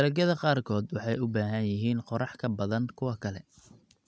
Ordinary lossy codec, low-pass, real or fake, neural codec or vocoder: none; none; real; none